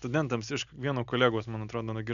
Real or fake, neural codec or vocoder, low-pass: real; none; 7.2 kHz